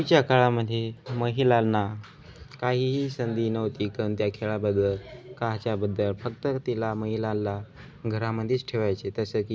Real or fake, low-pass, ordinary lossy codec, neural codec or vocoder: real; none; none; none